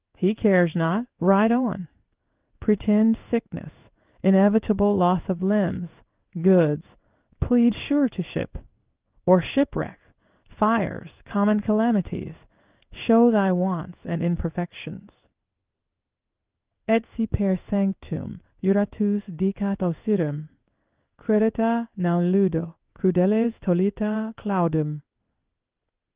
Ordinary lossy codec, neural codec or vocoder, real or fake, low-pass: Opus, 32 kbps; codec, 16 kHz in and 24 kHz out, 1 kbps, XY-Tokenizer; fake; 3.6 kHz